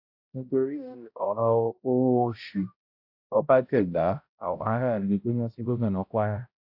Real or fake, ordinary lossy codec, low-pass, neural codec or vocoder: fake; AAC, 48 kbps; 5.4 kHz; codec, 16 kHz, 0.5 kbps, X-Codec, HuBERT features, trained on balanced general audio